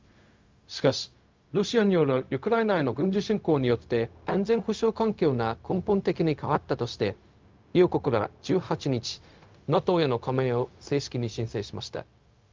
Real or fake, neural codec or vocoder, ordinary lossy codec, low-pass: fake; codec, 16 kHz, 0.4 kbps, LongCat-Audio-Codec; Opus, 32 kbps; 7.2 kHz